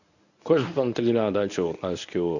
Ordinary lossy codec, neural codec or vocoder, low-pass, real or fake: none; codec, 24 kHz, 0.9 kbps, WavTokenizer, medium speech release version 1; 7.2 kHz; fake